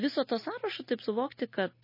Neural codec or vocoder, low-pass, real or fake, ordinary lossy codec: none; 5.4 kHz; real; MP3, 24 kbps